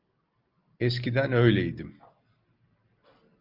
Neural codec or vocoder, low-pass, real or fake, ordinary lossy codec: none; 5.4 kHz; real; Opus, 32 kbps